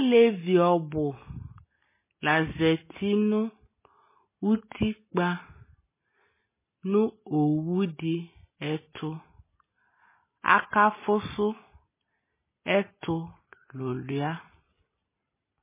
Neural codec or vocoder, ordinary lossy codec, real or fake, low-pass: none; MP3, 16 kbps; real; 3.6 kHz